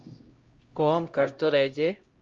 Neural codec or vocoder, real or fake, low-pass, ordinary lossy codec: codec, 16 kHz, 0.5 kbps, X-Codec, HuBERT features, trained on LibriSpeech; fake; 7.2 kHz; Opus, 32 kbps